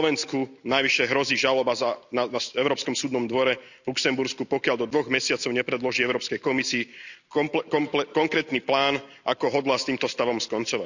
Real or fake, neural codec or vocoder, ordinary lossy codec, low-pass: real; none; none; 7.2 kHz